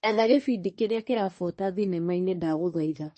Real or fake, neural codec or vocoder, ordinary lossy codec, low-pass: fake; codec, 24 kHz, 1 kbps, SNAC; MP3, 32 kbps; 10.8 kHz